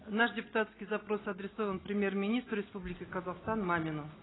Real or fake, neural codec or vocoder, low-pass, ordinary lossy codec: real; none; 7.2 kHz; AAC, 16 kbps